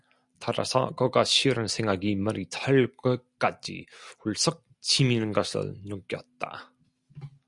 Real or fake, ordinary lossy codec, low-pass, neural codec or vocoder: real; Opus, 64 kbps; 10.8 kHz; none